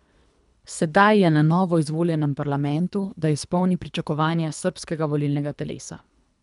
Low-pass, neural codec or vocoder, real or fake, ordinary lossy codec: 10.8 kHz; codec, 24 kHz, 3 kbps, HILCodec; fake; none